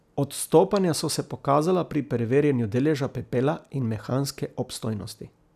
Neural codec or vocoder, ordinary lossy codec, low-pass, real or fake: none; none; 14.4 kHz; real